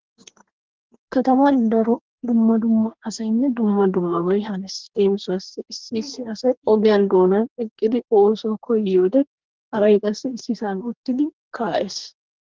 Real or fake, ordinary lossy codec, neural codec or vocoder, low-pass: fake; Opus, 16 kbps; codec, 44.1 kHz, 2.6 kbps, DAC; 7.2 kHz